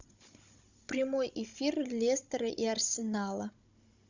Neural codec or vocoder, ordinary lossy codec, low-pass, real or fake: codec, 16 kHz, 16 kbps, FunCodec, trained on Chinese and English, 50 frames a second; Opus, 64 kbps; 7.2 kHz; fake